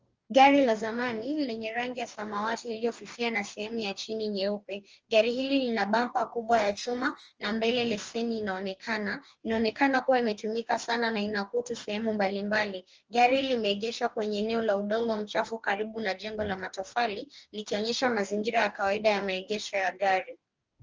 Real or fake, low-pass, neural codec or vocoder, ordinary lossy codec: fake; 7.2 kHz; codec, 44.1 kHz, 2.6 kbps, DAC; Opus, 24 kbps